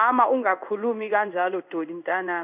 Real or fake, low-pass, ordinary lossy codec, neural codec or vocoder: fake; 3.6 kHz; none; codec, 16 kHz in and 24 kHz out, 1 kbps, XY-Tokenizer